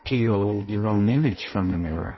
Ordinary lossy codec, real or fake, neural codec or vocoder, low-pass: MP3, 24 kbps; fake; codec, 16 kHz in and 24 kHz out, 0.6 kbps, FireRedTTS-2 codec; 7.2 kHz